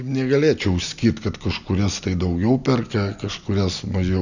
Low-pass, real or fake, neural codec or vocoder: 7.2 kHz; real; none